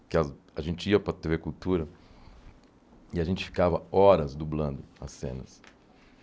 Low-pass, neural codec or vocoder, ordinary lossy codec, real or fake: none; none; none; real